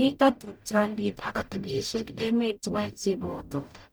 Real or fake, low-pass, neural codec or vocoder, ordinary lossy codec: fake; none; codec, 44.1 kHz, 0.9 kbps, DAC; none